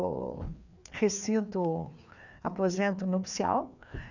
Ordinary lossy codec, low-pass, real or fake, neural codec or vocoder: none; 7.2 kHz; fake; codec, 16 kHz, 2 kbps, FreqCodec, larger model